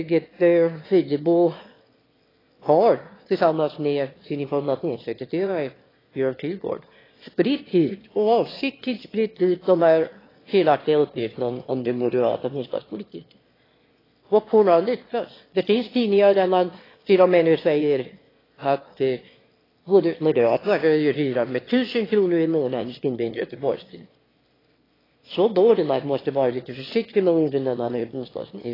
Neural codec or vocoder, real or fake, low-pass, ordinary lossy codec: autoencoder, 22.05 kHz, a latent of 192 numbers a frame, VITS, trained on one speaker; fake; 5.4 kHz; AAC, 24 kbps